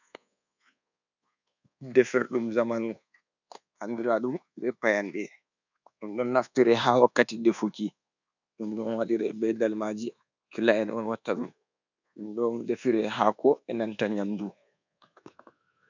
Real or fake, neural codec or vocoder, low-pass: fake; codec, 24 kHz, 1.2 kbps, DualCodec; 7.2 kHz